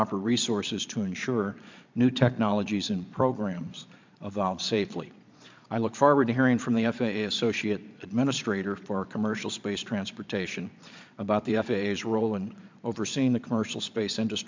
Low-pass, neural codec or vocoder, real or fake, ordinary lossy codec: 7.2 kHz; vocoder, 22.05 kHz, 80 mel bands, Vocos; fake; MP3, 64 kbps